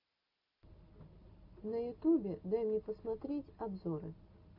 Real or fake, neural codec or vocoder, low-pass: real; none; 5.4 kHz